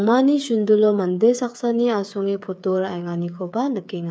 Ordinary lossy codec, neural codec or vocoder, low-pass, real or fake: none; codec, 16 kHz, 8 kbps, FreqCodec, smaller model; none; fake